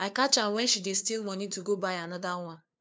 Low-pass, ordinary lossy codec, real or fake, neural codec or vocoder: none; none; fake; codec, 16 kHz, 2 kbps, FunCodec, trained on LibriTTS, 25 frames a second